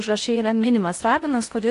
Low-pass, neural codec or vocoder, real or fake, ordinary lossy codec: 10.8 kHz; codec, 16 kHz in and 24 kHz out, 0.8 kbps, FocalCodec, streaming, 65536 codes; fake; AAC, 48 kbps